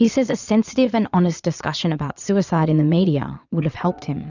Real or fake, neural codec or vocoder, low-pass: fake; vocoder, 22.05 kHz, 80 mel bands, Vocos; 7.2 kHz